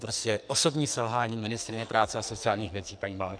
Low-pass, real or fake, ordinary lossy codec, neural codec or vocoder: 9.9 kHz; fake; MP3, 96 kbps; codec, 44.1 kHz, 2.6 kbps, SNAC